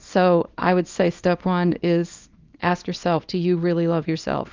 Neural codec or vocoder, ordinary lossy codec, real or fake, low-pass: codec, 24 kHz, 0.9 kbps, WavTokenizer, small release; Opus, 24 kbps; fake; 7.2 kHz